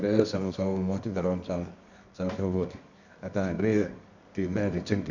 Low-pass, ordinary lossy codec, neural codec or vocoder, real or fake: 7.2 kHz; none; codec, 24 kHz, 0.9 kbps, WavTokenizer, medium music audio release; fake